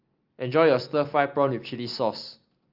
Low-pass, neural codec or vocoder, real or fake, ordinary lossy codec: 5.4 kHz; none; real; Opus, 24 kbps